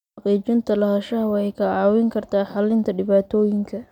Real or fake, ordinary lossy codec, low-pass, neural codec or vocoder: real; none; 19.8 kHz; none